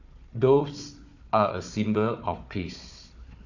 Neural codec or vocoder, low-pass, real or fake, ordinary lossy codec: codec, 16 kHz, 4 kbps, FunCodec, trained on Chinese and English, 50 frames a second; 7.2 kHz; fake; none